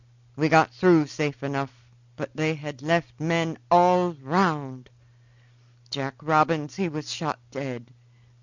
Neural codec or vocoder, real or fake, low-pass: none; real; 7.2 kHz